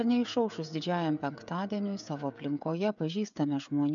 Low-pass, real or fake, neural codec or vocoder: 7.2 kHz; fake; codec, 16 kHz, 16 kbps, FreqCodec, smaller model